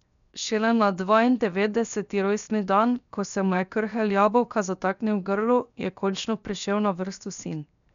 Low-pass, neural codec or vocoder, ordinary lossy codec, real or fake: 7.2 kHz; codec, 16 kHz, 0.7 kbps, FocalCodec; none; fake